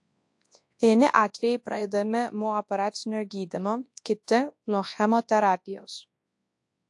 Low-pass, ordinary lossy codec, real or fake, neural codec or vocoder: 10.8 kHz; AAC, 64 kbps; fake; codec, 24 kHz, 0.9 kbps, WavTokenizer, large speech release